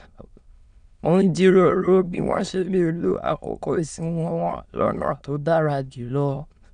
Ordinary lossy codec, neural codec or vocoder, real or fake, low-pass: none; autoencoder, 22.05 kHz, a latent of 192 numbers a frame, VITS, trained on many speakers; fake; 9.9 kHz